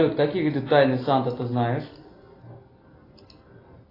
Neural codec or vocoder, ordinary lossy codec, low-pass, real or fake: none; AAC, 24 kbps; 5.4 kHz; real